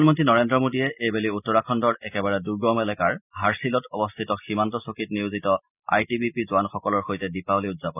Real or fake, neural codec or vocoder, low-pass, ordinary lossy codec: real; none; 3.6 kHz; none